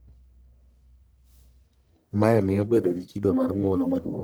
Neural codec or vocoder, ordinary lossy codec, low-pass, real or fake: codec, 44.1 kHz, 1.7 kbps, Pupu-Codec; none; none; fake